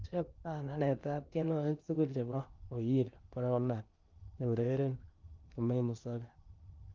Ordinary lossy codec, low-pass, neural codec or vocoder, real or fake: Opus, 24 kbps; 7.2 kHz; codec, 16 kHz in and 24 kHz out, 0.8 kbps, FocalCodec, streaming, 65536 codes; fake